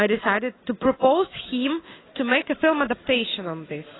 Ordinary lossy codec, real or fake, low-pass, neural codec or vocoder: AAC, 16 kbps; real; 7.2 kHz; none